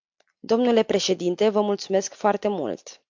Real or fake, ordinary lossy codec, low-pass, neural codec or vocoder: real; MP3, 48 kbps; 7.2 kHz; none